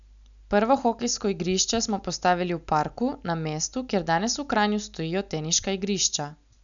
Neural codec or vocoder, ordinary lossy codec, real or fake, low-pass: none; none; real; 7.2 kHz